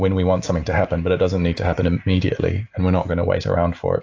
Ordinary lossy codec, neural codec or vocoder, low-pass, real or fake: AAC, 48 kbps; none; 7.2 kHz; real